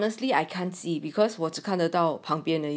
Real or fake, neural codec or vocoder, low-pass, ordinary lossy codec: real; none; none; none